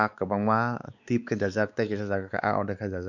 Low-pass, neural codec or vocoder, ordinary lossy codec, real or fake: 7.2 kHz; codec, 16 kHz, 4 kbps, X-Codec, WavLM features, trained on Multilingual LibriSpeech; none; fake